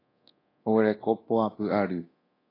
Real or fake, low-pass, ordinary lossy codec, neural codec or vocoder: fake; 5.4 kHz; AAC, 24 kbps; codec, 24 kHz, 0.9 kbps, DualCodec